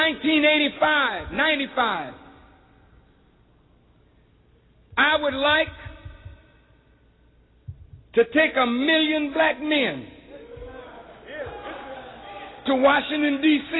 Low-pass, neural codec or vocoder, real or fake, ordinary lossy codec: 7.2 kHz; none; real; AAC, 16 kbps